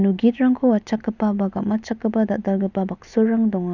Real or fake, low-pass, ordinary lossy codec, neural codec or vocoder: real; 7.2 kHz; none; none